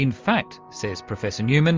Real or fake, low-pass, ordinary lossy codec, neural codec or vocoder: real; 7.2 kHz; Opus, 32 kbps; none